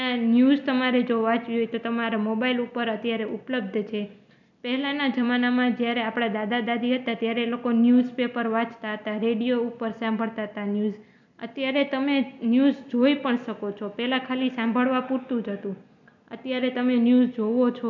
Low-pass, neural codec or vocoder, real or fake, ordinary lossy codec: 7.2 kHz; none; real; none